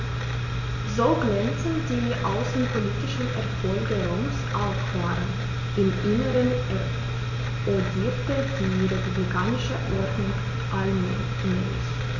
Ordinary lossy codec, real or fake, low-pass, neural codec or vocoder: none; real; 7.2 kHz; none